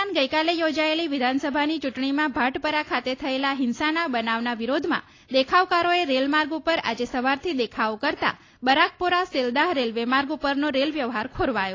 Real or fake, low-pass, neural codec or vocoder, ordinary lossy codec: real; 7.2 kHz; none; AAC, 32 kbps